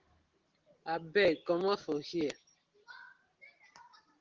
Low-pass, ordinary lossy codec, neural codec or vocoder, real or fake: 7.2 kHz; Opus, 16 kbps; none; real